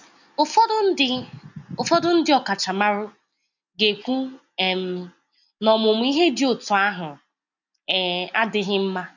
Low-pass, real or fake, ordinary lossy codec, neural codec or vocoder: 7.2 kHz; real; none; none